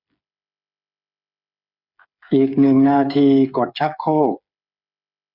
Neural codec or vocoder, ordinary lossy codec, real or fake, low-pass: codec, 16 kHz, 8 kbps, FreqCodec, smaller model; none; fake; 5.4 kHz